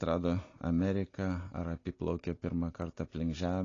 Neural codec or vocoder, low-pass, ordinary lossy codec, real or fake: none; 7.2 kHz; AAC, 32 kbps; real